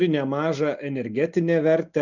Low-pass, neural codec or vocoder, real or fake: 7.2 kHz; none; real